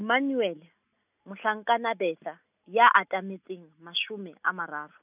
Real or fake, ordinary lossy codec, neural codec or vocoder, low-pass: real; none; none; 3.6 kHz